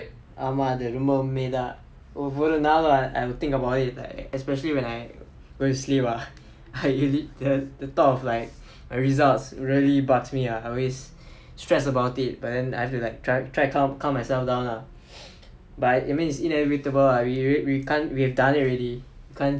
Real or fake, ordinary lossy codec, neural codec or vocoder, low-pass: real; none; none; none